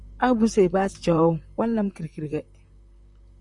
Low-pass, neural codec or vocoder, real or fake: 10.8 kHz; vocoder, 44.1 kHz, 128 mel bands, Pupu-Vocoder; fake